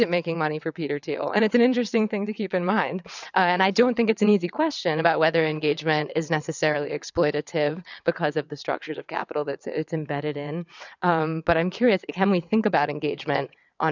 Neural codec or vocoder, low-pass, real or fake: vocoder, 22.05 kHz, 80 mel bands, WaveNeXt; 7.2 kHz; fake